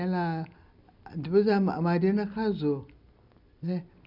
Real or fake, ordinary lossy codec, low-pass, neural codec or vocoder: real; none; 5.4 kHz; none